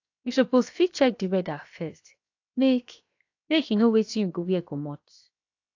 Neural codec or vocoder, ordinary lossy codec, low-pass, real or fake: codec, 16 kHz, 0.7 kbps, FocalCodec; AAC, 48 kbps; 7.2 kHz; fake